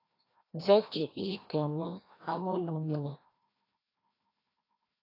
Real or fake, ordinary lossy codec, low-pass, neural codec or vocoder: fake; AAC, 24 kbps; 5.4 kHz; codec, 16 kHz, 1 kbps, FreqCodec, larger model